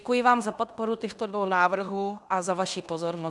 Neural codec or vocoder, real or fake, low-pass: codec, 16 kHz in and 24 kHz out, 0.9 kbps, LongCat-Audio-Codec, fine tuned four codebook decoder; fake; 10.8 kHz